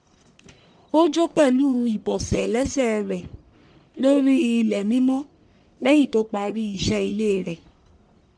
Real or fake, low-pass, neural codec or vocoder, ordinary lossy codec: fake; 9.9 kHz; codec, 44.1 kHz, 1.7 kbps, Pupu-Codec; none